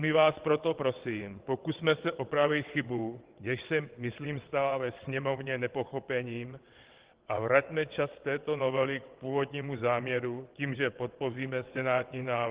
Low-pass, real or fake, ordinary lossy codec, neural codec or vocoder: 3.6 kHz; fake; Opus, 16 kbps; vocoder, 22.05 kHz, 80 mel bands, WaveNeXt